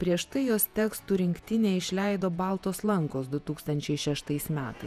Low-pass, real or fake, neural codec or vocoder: 14.4 kHz; fake; vocoder, 48 kHz, 128 mel bands, Vocos